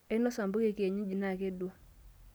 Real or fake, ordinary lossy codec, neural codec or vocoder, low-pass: real; none; none; none